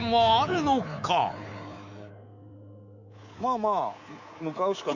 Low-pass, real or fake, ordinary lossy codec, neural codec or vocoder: 7.2 kHz; fake; Opus, 64 kbps; codec, 24 kHz, 3.1 kbps, DualCodec